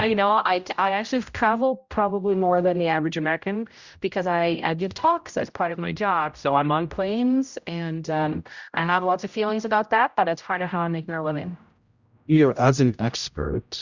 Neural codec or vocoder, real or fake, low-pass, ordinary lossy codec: codec, 16 kHz, 0.5 kbps, X-Codec, HuBERT features, trained on general audio; fake; 7.2 kHz; Opus, 64 kbps